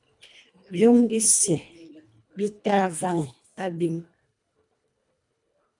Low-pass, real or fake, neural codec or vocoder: 10.8 kHz; fake; codec, 24 kHz, 1.5 kbps, HILCodec